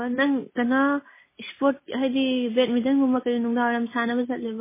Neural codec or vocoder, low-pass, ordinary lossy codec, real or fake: none; 3.6 kHz; MP3, 16 kbps; real